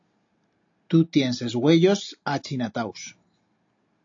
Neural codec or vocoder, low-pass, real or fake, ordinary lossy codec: none; 7.2 kHz; real; AAC, 48 kbps